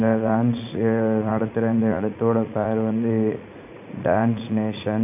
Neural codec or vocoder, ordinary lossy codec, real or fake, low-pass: vocoder, 44.1 kHz, 80 mel bands, Vocos; none; fake; 3.6 kHz